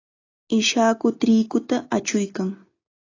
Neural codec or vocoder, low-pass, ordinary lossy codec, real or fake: none; 7.2 kHz; AAC, 32 kbps; real